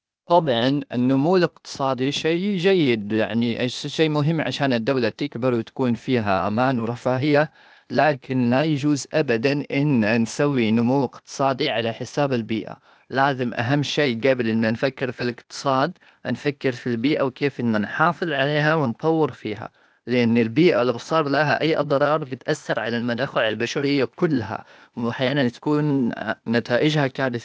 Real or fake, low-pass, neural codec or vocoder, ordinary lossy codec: fake; none; codec, 16 kHz, 0.8 kbps, ZipCodec; none